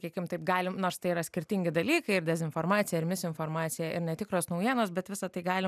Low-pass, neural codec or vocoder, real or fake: 14.4 kHz; none; real